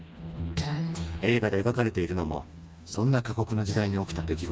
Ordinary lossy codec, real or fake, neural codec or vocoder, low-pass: none; fake; codec, 16 kHz, 2 kbps, FreqCodec, smaller model; none